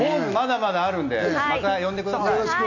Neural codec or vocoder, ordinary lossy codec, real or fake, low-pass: none; none; real; 7.2 kHz